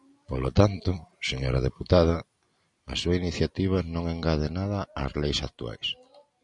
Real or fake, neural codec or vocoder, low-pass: real; none; 10.8 kHz